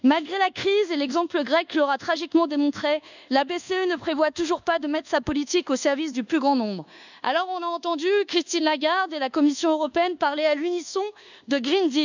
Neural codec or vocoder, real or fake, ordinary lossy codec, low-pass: codec, 24 kHz, 1.2 kbps, DualCodec; fake; none; 7.2 kHz